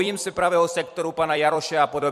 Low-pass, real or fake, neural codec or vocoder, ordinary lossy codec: 14.4 kHz; fake; vocoder, 44.1 kHz, 128 mel bands every 256 samples, BigVGAN v2; MP3, 64 kbps